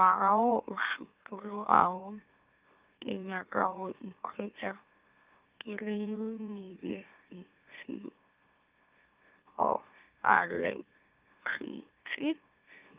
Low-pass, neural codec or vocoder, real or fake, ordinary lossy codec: 3.6 kHz; autoencoder, 44.1 kHz, a latent of 192 numbers a frame, MeloTTS; fake; Opus, 24 kbps